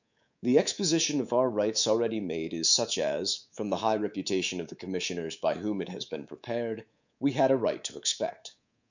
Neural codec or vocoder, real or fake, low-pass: codec, 24 kHz, 3.1 kbps, DualCodec; fake; 7.2 kHz